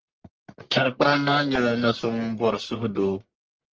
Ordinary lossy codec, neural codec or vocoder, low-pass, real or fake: Opus, 24 kbps; codec, 44.1 kHz, 1.7 kbps, Pupu-Codec; 7.2 kHz; fake